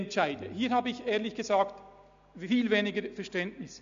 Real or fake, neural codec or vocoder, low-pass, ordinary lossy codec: real; none; 7.2 kHz; MP3, 64 kbps